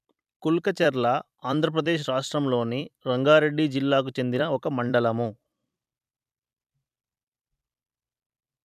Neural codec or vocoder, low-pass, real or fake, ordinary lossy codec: vocoder, 44.1 kHz, 128 mel bands every 256 samples, BigVGAN v2; 14.4 kHz; fake; none